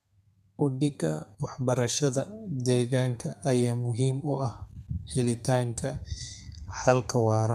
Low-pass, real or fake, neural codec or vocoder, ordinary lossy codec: 14.4 kHz; fake; codec, 32 kHz, 1.9 kbps, SNAC; none